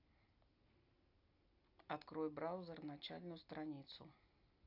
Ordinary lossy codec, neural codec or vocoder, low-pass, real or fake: AAC, 48 kbps; none; 5.4 kHz; real